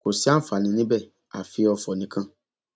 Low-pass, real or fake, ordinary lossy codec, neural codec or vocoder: none; real; none; none